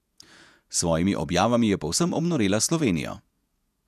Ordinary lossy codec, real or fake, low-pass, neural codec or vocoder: none; fake; 14.4 kHz; vocoder, 48 kHz, 128 mel bands, Vocos